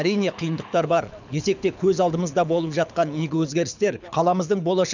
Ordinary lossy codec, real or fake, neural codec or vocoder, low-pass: none; fake; codec, 24 kHz, 6 kbps, HILCodec; 7.2 kHz